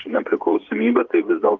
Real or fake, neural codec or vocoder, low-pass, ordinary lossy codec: fake; vocoder, 22.05 kHz, 80 mel bands, Vocos; 7.2 kHz; Opus, 32 kbps